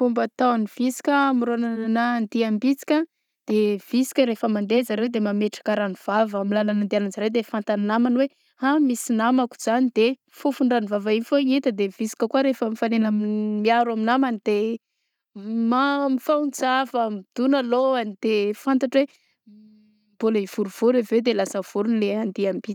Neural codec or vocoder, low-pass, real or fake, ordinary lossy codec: none; 19.8 kHz; real; none